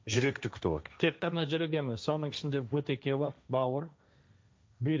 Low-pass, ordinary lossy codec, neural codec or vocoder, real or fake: none; none; codec, 16 kHz, 1.1 kbps, Voila-Tokenizer; fake